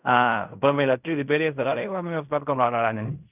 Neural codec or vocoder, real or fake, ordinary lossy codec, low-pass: codec, 16 kHz in and 24 kHz out, 0.4 kbps, LongCat-Audio-Codec, fine tuned four codebook decoder; fake; none; 3.6 kHz